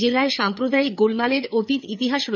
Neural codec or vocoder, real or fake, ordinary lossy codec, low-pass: codec, 16 kHz, 4 kbps, FreqCodec, larger model; fake; none; 7.2 kHz